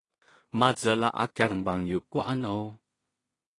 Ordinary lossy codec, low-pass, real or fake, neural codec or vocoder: AAC, 32 kbps; 10.8 kHz; fake; codec, 16 kHz in and 24 kHz out, 0.4 kbps, LongCat-Audio-Codec, two codebook decoder